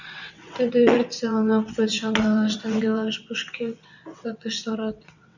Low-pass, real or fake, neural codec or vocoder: 7.2 kHz; fake; vocoder, 22.05 kHz, 80 mel bands, WaveNeXt